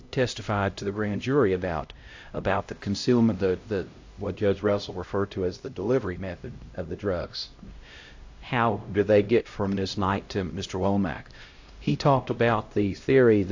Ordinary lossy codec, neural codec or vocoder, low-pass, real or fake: AAC, 48 kbps; codec, 16 kHz, 0.5 kbps, X-Codec, HuBERT features, trained on LibriSpeech; 7.2 kHz; fake